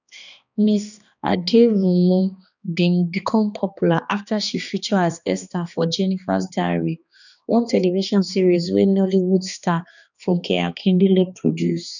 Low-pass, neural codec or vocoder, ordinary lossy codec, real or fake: 7.2 kHz; codec, 16 kHz, 2 kbps, X-Codec, HuBERT features, trained on balanced general audio; none; fake